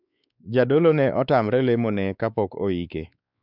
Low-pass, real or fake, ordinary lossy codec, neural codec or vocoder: 5.4 kHz; fake; none; codec, 16 kHz, 4 kbps, X-Codec, WavLM features, trained on Multilingual LibriSpeech